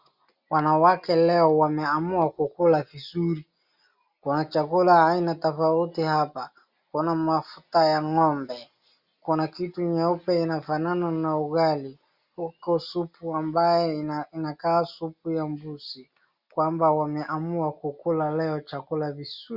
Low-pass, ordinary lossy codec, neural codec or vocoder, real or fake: 5.4 kHz; Opus, 64 kbps; none; real